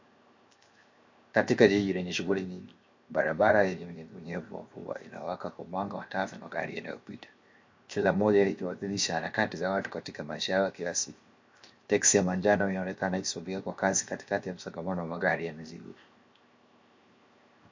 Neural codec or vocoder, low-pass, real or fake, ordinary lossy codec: codec, 16 kHz, 0.7 kbps, FocalCodec; 7.2 kHz; fake; MP3, 48 kbps